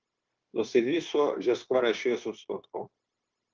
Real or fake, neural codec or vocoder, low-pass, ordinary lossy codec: fake; codec, 16 kHz, 0.9 kbps, LongCat-Audio-Codec; 7.2 kHz; Opus, 32 kbps